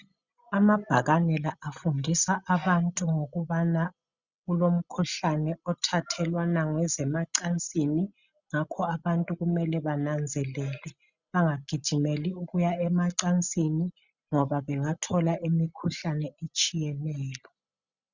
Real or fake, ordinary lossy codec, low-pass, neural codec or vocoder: real; Opus, 64 kbps; 7.2 kHz; none